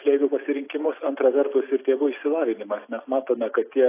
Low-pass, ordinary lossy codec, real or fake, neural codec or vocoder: 3.6 kHz; AAC, 24 kbps; real; none